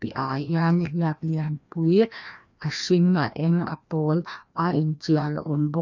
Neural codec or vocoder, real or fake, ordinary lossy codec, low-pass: codec, 16 kHz, 1 kbps, FreqCodec, larger model; fake; none; 7.2 kHz